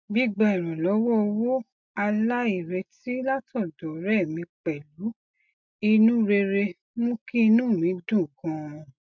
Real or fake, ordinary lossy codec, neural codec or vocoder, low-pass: real; MP3, 64 kbps; none; 7.2 kHz